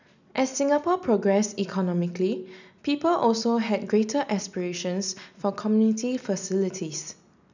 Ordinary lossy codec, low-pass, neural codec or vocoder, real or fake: none; 7.2 kHz; none; real